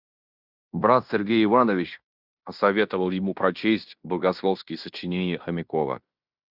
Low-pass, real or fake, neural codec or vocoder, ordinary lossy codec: 5.4 kHz; fake; codec, 16 kHz in and 24 kHz out, 0.9 kbps, LongCat-Audio-Codec, fine tuned four codebook decoder; Opus, 64 kbps